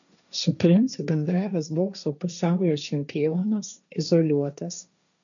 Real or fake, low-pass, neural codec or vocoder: fake; 7.2 kHz; codec, 16 kHz, 1.1 kbps, Voila-Tokenizer